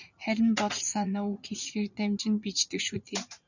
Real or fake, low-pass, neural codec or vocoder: fake; 7.2 kHz; vocoder, 44.1 kHz, 80 mel bands, Vocos